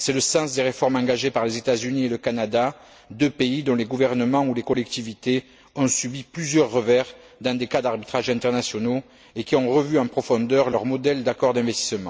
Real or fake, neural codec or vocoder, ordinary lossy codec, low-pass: real; none; none; none